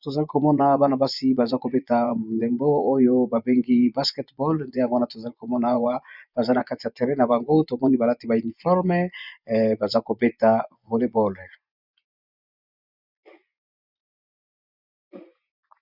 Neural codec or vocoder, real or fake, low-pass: vocoder, 24 kHz, 100 mel bands, Vocos; fake; 5.4 kHz